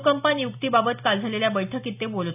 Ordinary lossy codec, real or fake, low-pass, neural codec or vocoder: none; real; 3.6 kHz; none